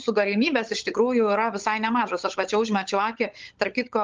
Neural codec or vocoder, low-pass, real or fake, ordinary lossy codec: codec, 16 kHz, 16 kbps, FunCodec, trained on LibriTTS, 50 frames a second; 7.2 kHz; fake; Opus, 32 kbps